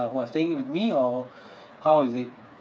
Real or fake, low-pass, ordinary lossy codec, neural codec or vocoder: fake; none; none; codec, 16 kHz, 4 kbps, FreqCodec, smaller model